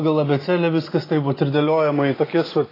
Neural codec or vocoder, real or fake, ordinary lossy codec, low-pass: none; real; AAC, 24 kbps; 5.4 kHz